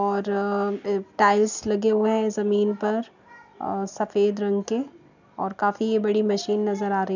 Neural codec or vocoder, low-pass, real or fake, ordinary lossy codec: none; 7.2 kHz; real; none